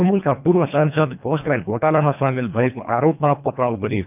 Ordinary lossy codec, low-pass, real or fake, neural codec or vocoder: none; 3.6 kHz; fake; codec, 24 kHz, 1.5 kbps, HILCodec